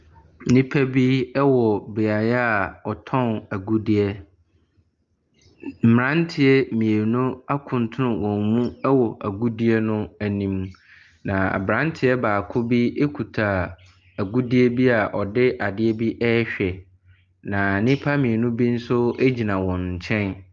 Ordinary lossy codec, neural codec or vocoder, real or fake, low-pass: Opus, 32 kbps; none; real; 7.2 kHz